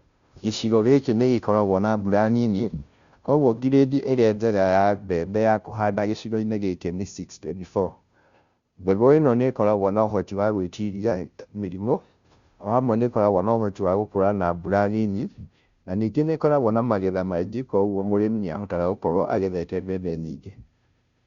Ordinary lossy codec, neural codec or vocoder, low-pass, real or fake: Opus, 64 kbps; codec, 16 kHz, 0.5 kbps, FunCodec, trained on Chinese and English, 25 frames a second; 7.2 kHz; fake